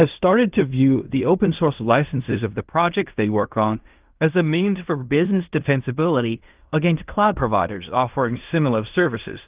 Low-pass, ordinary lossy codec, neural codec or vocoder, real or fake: 3.6 kHz; Opus, 24 kbps; codec, 16 kHz in and 24 kHz out, 0.4 kbps, LongCat-Audio-Codec, fine tuned four codebook decoder; fake